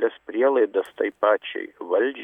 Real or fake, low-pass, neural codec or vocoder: real; 19.8 kHz; none